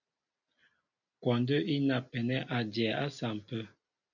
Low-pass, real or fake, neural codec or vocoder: 7.2 kHz; real; none